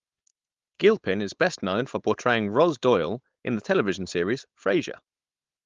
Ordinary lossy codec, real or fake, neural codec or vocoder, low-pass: Opus, 32 kbps; fake; codec, 16 kHz, 4.8 kbps, FACodec; 7.2 kHz